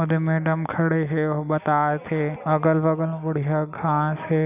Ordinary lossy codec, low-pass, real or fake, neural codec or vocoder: none; 3.6 kHz; real; none